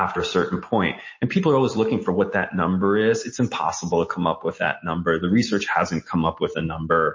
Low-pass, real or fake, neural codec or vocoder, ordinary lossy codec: 7.2 kHz; real; none; MP3, 32 kbps